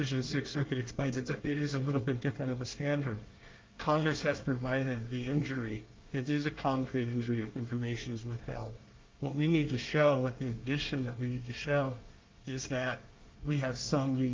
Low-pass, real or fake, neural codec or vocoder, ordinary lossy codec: 7.2 kHz; fake; codec, 24 kHz, 1 kbps, SNAC; Opus, 24 kbps